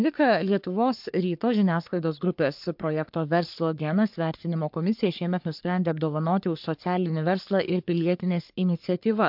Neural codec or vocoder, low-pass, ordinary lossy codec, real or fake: codec, 44.1 kHz, 3.4 kbps, Pupu-Codec; 5.4 kHz; MP3, 48 kbps; fake